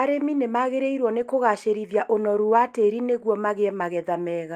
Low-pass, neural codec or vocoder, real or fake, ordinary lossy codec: 14.4 kHz; none; real; Opus, 32 kbps